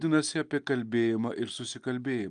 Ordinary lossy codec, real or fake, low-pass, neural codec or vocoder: Opus, 32 kbps; real; 9.9 kHz; none